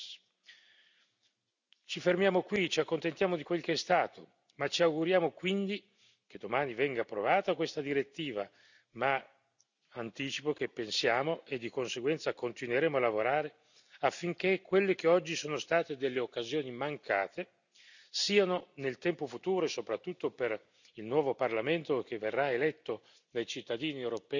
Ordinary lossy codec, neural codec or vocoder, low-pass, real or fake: none; none; 7.2 kHz; real